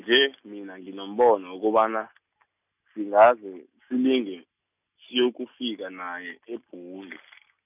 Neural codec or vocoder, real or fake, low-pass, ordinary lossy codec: none; real; 3.6 kHz; none